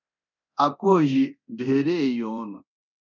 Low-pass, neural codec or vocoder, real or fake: 7.2 kHz; codec, 24 kHz, 0.9 kbps, DualCodec; fake